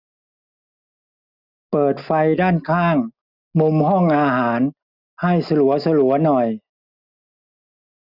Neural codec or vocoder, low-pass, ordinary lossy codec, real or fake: none; 5.4 kHz; none; real